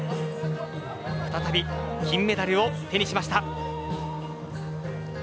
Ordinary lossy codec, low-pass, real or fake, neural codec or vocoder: none; none; real; none